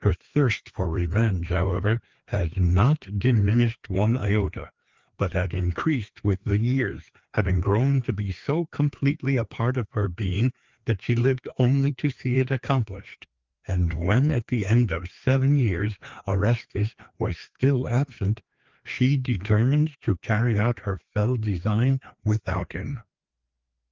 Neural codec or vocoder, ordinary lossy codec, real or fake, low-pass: codec, 16 kHz, 2 kbps, FreqCodec, larger model; Opus, 32 kbps; fake; 7.2 kHz